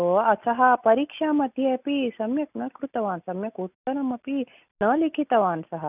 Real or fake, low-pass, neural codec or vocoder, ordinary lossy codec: real; 3.6 kHz; none; none